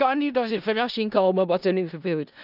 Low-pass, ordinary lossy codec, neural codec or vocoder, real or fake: 5.4 kHz; none; codec, 16 kHz in and 24 kHz out, 0.4 kbps, LongCat-Audio-Codec, four codebook decoder; fake